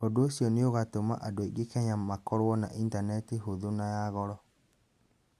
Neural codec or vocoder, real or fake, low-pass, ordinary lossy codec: none; real; 14.4 kHz; AAC, 96 kbps